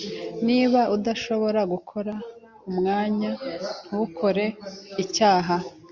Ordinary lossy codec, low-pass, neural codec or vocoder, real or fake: Opus, 64 kbps; 7.2 kHz; none; real